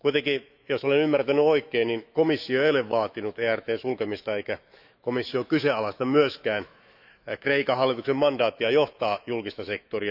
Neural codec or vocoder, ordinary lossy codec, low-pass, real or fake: autoencoder, 48 kHz, 128 numbers a frame, DAC-VAE, trained on Japanese speech; none; 5.4 kHz; fake